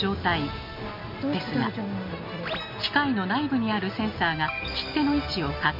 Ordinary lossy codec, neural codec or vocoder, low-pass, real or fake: MP3, 32 kbps; none; 5.4 kHz; real